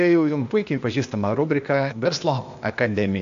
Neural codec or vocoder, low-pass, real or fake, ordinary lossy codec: codec, 16 kHz, 0.8 kbps, ZipCodec; 7.2 kHz; fake; AAC, 64 kbps